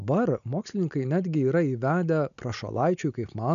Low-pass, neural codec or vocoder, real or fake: 7.2 kHz; none; real